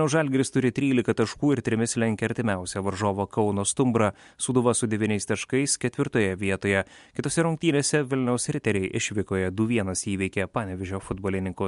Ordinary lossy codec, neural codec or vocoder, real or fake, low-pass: MP3, 64 kbps; none; real; 10.8 kHz